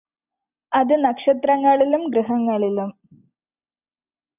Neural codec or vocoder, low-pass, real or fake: none; 3.6 kHz; real